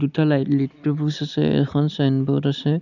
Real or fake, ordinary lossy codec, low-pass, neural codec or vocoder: real; none; 7.2 kHz; none